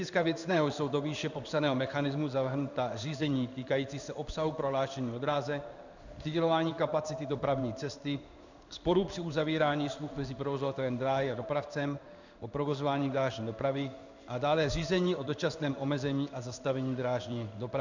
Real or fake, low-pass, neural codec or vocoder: fake; 7.2 kHz; codec, 16 kHz in and 24 kHz out, 1 kbps, XY-Tokenizer